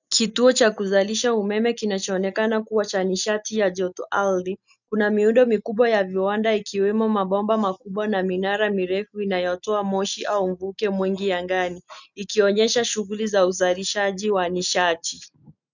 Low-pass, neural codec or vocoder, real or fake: 7.2 kHz; none; real